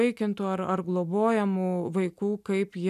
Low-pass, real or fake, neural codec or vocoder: 14.4 kHz; real; none